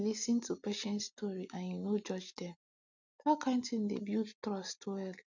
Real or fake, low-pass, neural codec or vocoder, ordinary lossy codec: real; 7.2 kHz; none; none